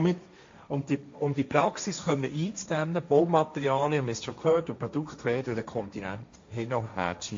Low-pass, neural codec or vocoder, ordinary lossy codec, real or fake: 7.2 kHz; codec, 16 kHz, 1.1 kbps, Voila-Tokenizer; MP3, 48 kbps; fake